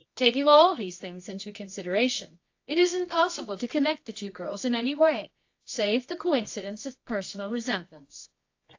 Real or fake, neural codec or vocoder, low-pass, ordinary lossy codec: fake; codec, 24 kHz, 0.9 kbps, WavTokenizer, medium music audio release; 7.2 kHz; AAC, 48 kbps